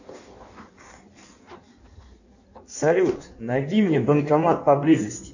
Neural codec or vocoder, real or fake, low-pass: codec, 16 kHz in and 24 kHz out, 1.1 kbps, FireRedTTS-2 codec; fake; 7.2 kHz